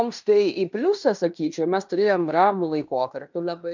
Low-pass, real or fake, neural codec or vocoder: 7.2 kHz; fake; codec, 16 kHz in and 24 kHz out, 0.9 kbps, LongCat-Audio-Codec, fine tuned four codebook decoder